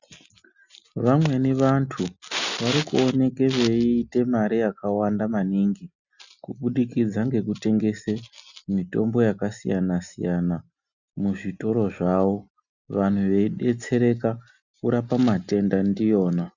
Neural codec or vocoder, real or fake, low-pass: none; real; 7.2 kHz